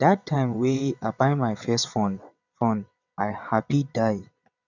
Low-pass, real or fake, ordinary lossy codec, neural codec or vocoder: 7.2 kHz; fake; none; vocoder, 22.05 kHz, 80 mel bands, WaveNeXt